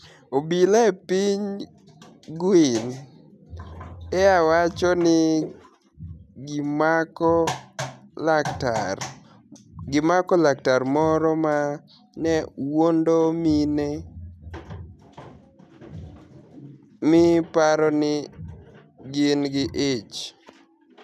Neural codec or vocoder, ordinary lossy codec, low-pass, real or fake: none; none; 14.4 kHz; real